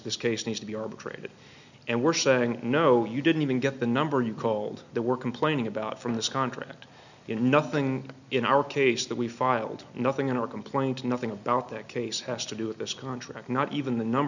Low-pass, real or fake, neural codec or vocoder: 7.2 kHz; real; none